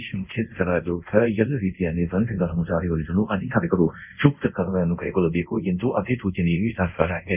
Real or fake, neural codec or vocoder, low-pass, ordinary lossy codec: fake; codec, 24 kHz, 0.5 kbps, DualCodec; 3.6 kHz; none